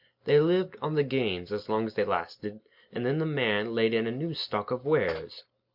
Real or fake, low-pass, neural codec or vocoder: real; 5.4 kHz; none